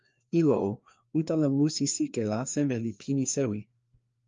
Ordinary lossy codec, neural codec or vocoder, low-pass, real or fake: Opus, 32 kbps; codec, 16 kHz, 2 kbps, FreqCodec, larger model; 7.2 kHz; fake